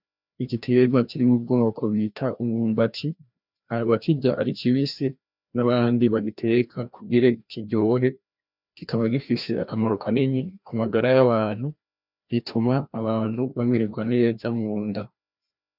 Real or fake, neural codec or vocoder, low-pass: fake; codec, 16 kHz, 1 kbps, FreqCodec, larger model; 5.4 kHz